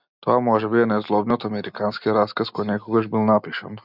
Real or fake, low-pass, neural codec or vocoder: real; 5.4 kHz; none